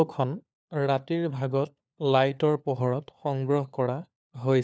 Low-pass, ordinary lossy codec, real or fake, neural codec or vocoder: none; none; fake; codec, 16 kHz, 4 kbps, FunCodec, trained on LibriTTS, 50 frames a second